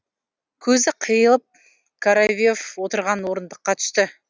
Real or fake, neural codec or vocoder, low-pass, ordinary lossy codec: real; none; none; none